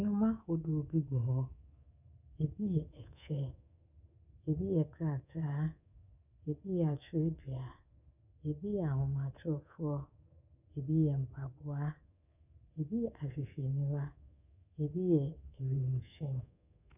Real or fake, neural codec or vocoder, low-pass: fake; codec, 24 kHz, 3.1 kbps, DualCodec; 3.6 kHz